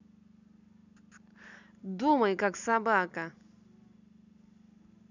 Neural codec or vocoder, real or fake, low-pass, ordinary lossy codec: none; real; 7.2 kHz; none